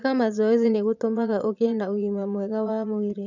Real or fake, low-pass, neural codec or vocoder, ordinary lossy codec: fake; 7.2 kHz; vocoder, 22.05 kHz, 80 mel bands, Vocos; none